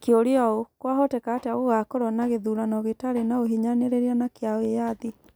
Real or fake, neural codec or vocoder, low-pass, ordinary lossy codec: real; none; none; none